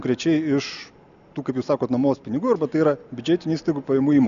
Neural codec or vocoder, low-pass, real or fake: none; 7.2 kHz; real